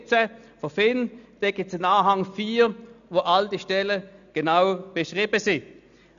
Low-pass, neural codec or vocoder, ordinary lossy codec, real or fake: 7.2 kHz; none; none; real